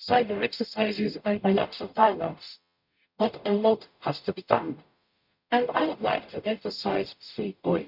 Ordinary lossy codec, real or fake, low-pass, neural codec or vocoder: none; fake; 5.4 kHz; codec, 44.1 kHz, 0.9 kbps, DAC